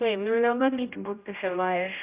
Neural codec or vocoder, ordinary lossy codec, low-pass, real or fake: codec, 16 kHz, 0.5 kbps, X-Codec, HuBERT features, trained on general audio; Opus, 64 kbps; 3.6 kHz; fake